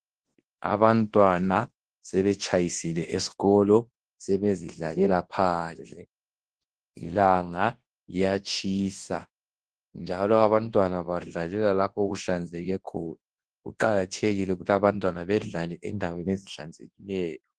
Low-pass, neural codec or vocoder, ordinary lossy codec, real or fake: 10.8 kHz; codec, 24 kHz, 0.9 kbps, WavTokenizer, large speech release; Opus, 16 kbps; fake